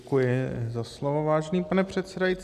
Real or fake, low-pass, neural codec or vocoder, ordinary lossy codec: real; 14.4 kHz; none; MP3, 96 kbps